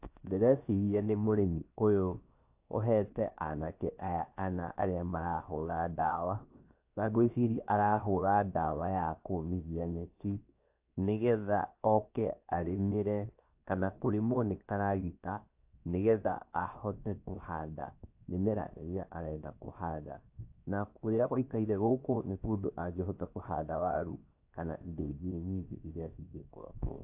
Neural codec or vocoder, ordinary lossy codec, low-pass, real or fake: codec, 16 kHz, 0.7 kbps, FocalCodec; AAC, 32 kbps; 3.6 kHz; fake